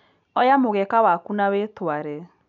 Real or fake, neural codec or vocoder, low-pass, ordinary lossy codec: real; none; 7.2 kHz; none